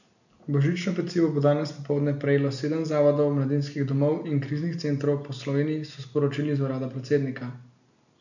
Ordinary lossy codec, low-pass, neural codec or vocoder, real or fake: none; 7.2 kHz; none; real